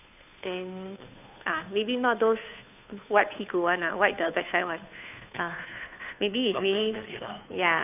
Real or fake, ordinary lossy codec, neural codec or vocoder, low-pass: fake; none; codec, 16 kHz, 2 kbps, FunCodec, trained on Chinese and English, 25 frames a second; 3.6 kHz